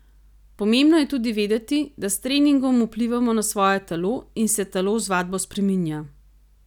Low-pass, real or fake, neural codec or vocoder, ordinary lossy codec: 19.8 kHz; real; none; none